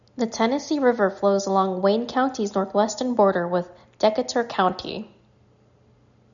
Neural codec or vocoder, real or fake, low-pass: none; real; 7.2 kHz